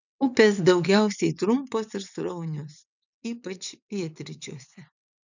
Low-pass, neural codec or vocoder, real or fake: 7.2 kHz; vocoder, 22.05 kHz, 80 mel bands, WaveNeXt; fake